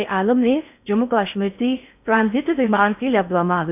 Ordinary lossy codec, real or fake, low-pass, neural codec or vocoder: none; fake; 3.6 kHz; codec, 16 kHz in and 24 kHz out, 0.6 kbps, FocalCodec, streaming, 4096 codes